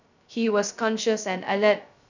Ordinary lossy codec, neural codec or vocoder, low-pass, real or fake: none; codec, 16 kHz, 0.2 kbps, FocalCodec; 7.2 kHz; fake